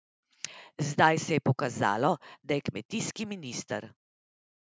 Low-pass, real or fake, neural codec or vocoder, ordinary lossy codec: none; real; none; none